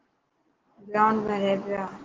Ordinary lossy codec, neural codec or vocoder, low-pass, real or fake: Opus, 16 kbps; none; 7.2 kHz; real